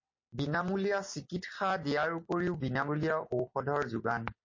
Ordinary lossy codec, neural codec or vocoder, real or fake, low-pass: MP3, 32 kbps; none; real; 7.2 kHz